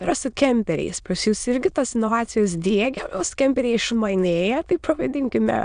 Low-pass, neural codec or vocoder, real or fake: 9.9 kHz; autoencoder, 22.05 kHz, a latent of 192 numbers a frame, VITS, trained on many speakers; fake